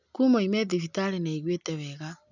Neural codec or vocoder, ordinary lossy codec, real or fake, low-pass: none; none; real; 7.2 kHz